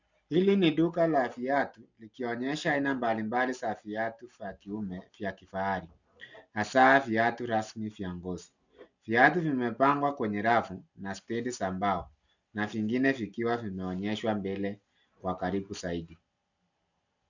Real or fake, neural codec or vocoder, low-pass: real; none; 7.2 kHz